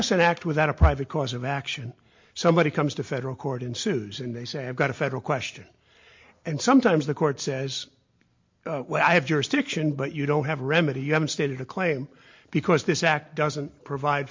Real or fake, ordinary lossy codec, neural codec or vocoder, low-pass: real; MP3, 48 kbps; none; 7.2 kHz